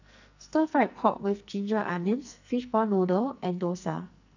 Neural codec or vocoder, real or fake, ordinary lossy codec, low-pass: codec, 44.1 kHz, 2.6 kbps, SNAC; fake; none; 7.2 kHz